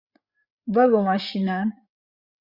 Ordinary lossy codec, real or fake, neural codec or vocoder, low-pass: Opus, 64 kbps; fake; codec, 16 kHz, 8 kbps, FreqCodec, larger model; 5.4 kHz